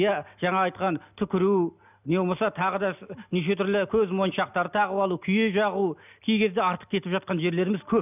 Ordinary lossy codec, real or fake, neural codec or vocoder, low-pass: none; real; none; 3.6 kHz